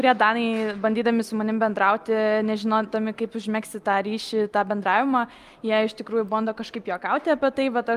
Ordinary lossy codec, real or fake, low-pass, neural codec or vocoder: Opus, 32 kbps; real; 14.4 kHz; none